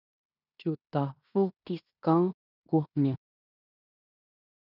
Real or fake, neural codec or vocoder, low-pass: fake; codec, 16 kHz in and 24 kHz out, 0.9 kbps, LongCat-Audio-Codec, fine tuned four codebook decoder; 5.4 kHz